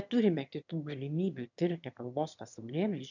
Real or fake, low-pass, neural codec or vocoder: fake; 7.2 kHz; autoencoder, 22.05 kHz, a latent of 192 numbers a frame, VITS, trained on one speaker